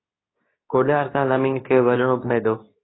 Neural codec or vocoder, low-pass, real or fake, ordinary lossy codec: codec, 24 kHz, 0.9 kbps, WavTokenizer, medium speech release version 2; 7.2 kHz; fake; AAC, 16 kbps